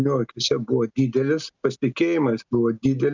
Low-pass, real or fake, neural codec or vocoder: 7.2 kHz; real; none